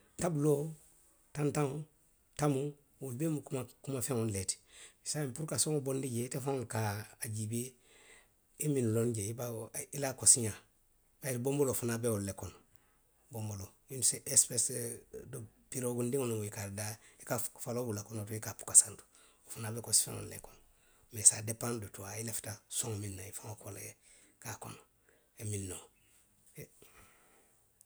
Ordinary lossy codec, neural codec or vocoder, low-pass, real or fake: none; none; none; real